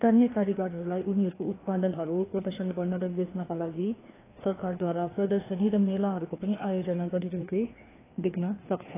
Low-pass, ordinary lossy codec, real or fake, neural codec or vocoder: 3.6 kHz; AAC, 16 kbps; fake; codec, 16 kHz, 2 kbps, FreqCodec, larger model